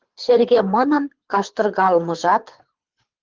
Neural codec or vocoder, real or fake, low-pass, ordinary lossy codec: codec, 24 kHz, 6 kbps, HILCodec; fake; 7.2 kHz; Opus, 16 kbps